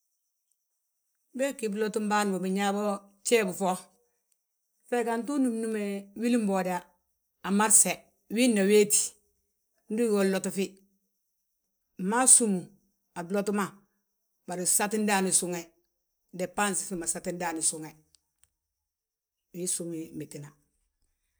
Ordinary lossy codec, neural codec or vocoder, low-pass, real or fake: none; none; none; real